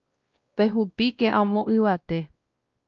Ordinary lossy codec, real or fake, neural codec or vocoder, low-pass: Opus, 24 kbps; fake; codec, 16 kHz, 1 kbps, X-Codec, WavLM features, trained on Multilingual LibriSpeech; 7.2 kHz